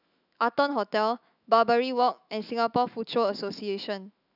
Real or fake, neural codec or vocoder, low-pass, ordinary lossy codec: fake; autoencoder, 48 kHz, 128 numbers a frame, DAC-VAE, trained on Japanese speech; 5.4 kHz; none